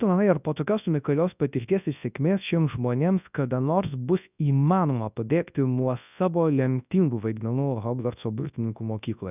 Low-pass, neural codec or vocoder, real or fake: 3.6 kHz; codec, 24 kHz, 0.9 kbps, WavTokenizer, large speech release; fake